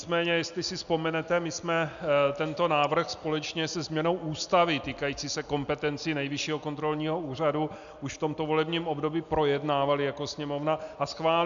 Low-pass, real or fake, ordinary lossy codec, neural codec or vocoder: 7.2 kHz; real; MP3, 96 kbps; none